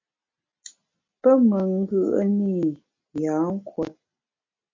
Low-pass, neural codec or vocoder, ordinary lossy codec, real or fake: 7.2 kHz; none; MP3, 32 kbps; real